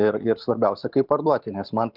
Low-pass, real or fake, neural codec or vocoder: 5.4 kHz; real; none